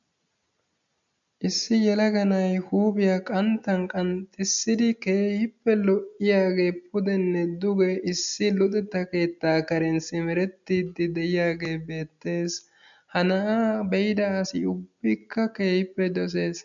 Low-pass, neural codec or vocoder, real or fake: 7.2 kHz; none; real